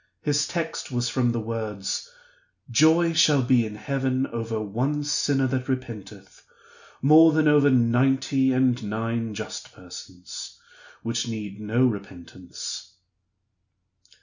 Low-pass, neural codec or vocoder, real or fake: 7.2 kHz; none; real